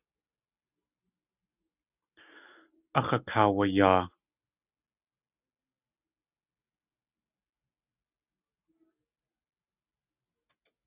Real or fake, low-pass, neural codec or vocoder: real; 3.6 kHz; none